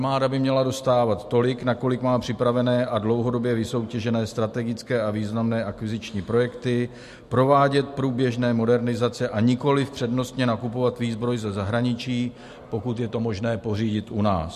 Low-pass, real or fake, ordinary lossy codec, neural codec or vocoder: 14.4 kHz; real; MP3, 64 kbps; none